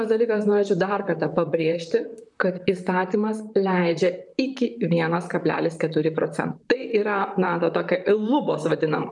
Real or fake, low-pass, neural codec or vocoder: fake; 10.8 kHz; vocoder, 44.1 kHz, 128 mel bands, Pupu-Vocoder